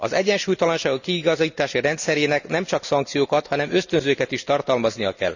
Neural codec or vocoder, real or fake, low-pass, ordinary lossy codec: none; real; 7.2 kHz; none